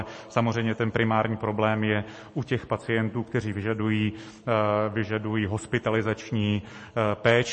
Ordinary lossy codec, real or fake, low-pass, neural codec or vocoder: MP3, 32 kbps; real; 10.8 kHz; none